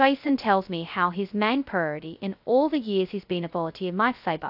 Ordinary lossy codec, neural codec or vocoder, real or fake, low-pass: AAC, 48 kbps; codec, 16 kHz, 0.2 kbps, FocalCodec; fake; 5.4 kHz